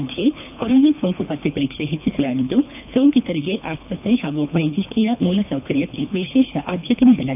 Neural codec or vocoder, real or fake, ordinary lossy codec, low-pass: codec, 24 kHz, 3 kbps, HILCodec; fake; none; 3.6 kHz